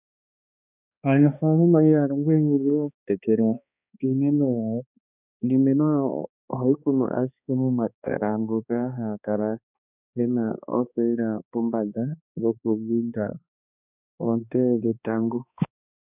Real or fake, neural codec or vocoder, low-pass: fake; codec, 16 kHz, 2 kbps, X-Codec, HuBERT features, trained on balanced general audio; 3.6 kHz